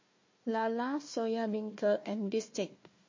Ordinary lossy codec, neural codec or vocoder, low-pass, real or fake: MP3, 32 kbps; codec, 16 kHz, 1 kbps, FunCodec, trained on Chinese and English, 50 frames a second; 7.2 kHz; fake